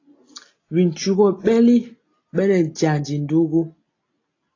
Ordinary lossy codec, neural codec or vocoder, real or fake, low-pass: AAC, 32 kbps; none; real; 7.2 kHz